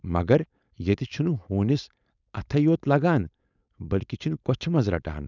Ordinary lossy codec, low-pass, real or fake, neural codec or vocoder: none; 7.2 kHz; fake; codec, 16 kHz, 4.8 kbps, FACodec